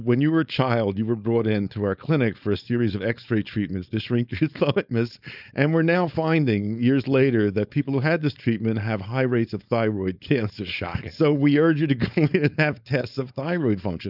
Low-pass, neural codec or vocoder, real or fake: 5.4 kHz; codec, 16 kHz, 4.8 kbps, FACodec; fake